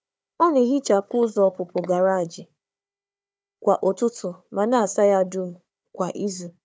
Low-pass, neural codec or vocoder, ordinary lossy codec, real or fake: none; codec, 16 kHz, 4 kbps, FunCodec, trained on Chinese and English, 50 frames a second; none; fake